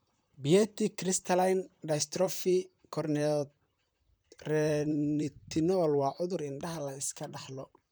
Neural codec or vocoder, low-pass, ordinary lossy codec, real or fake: vocoder, 44.1 kHz, 128 mel bands, Pupu-Vocoder; none; none; fake